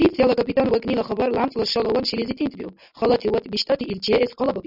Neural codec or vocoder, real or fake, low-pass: none; real; 5.4 kHz